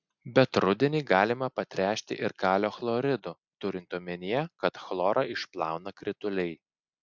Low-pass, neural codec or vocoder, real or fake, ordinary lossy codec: 7.2 kHz; none; real; MP3, 64 kbps